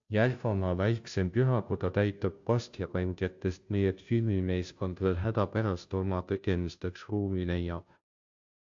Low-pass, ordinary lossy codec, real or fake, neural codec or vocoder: 7.2 kHz; AAC, 64 kbps; fake; codec, 16 kHz, 0.5 kbps, FunCodec, trained on Chinese and English, 25 frames a second